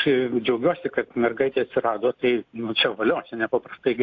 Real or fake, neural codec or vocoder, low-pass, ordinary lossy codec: real; none; 7.2 kHz; AAC, 48 kbps